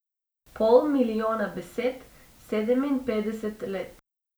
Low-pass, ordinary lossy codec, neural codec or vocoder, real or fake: none; none; none; real